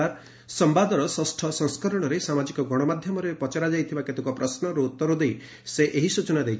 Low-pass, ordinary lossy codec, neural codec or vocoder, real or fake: none; none; none; real